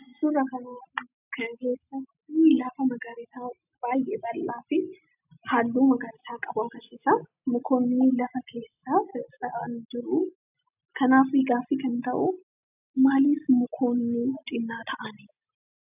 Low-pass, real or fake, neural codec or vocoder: 3.6 kHz; real; none